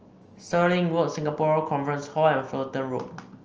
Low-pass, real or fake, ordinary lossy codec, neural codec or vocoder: 7.2 kHz; real; Opus, 24 kbps; none